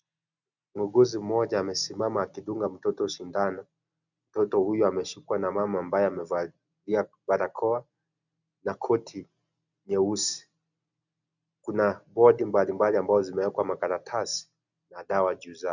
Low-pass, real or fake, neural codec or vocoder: 7.2 kHz; real; none